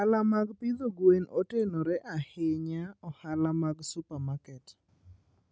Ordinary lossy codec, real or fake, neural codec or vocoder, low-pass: none; real; none; none